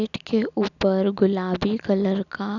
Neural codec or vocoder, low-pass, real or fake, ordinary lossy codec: none; 7.2 kHz; real; none